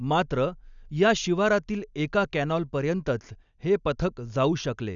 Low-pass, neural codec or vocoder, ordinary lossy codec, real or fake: 7.2 kHz; none; none; real